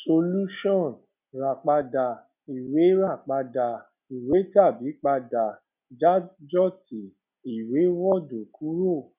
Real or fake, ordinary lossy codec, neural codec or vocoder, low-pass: real; none; none; 3.6 kHz